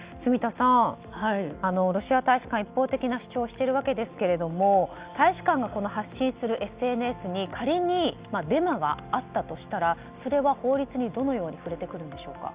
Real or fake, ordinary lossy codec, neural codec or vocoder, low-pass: real; Opus, 64 kbps; none; 3.6 kHz